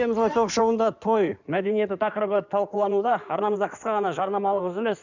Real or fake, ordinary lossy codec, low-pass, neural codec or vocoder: fake; none; 7.2 kHz; codec, 16 kHz in and 24 kHz out, 2.2 kbps, FireRedTTS-2 codec